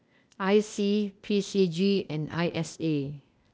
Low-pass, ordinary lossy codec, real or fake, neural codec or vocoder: none; none; fake; codec, 16 kHz, 0.8 kbps, ZipCodec